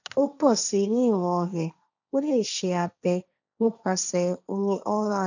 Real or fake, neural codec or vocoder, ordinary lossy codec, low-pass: fake; codec, 16 kHz, 1.1 kbps, Voila-Tokenizer; none; 7.2 kHz